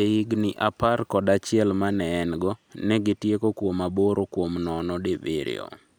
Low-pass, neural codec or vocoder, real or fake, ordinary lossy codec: none; none; real; none